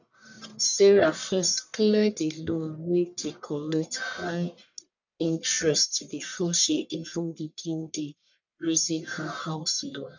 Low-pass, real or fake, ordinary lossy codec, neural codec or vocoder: 7.2 kHz; fake; none; codec, 44.1 kHz, 1.7 kbps, Pupu-Codec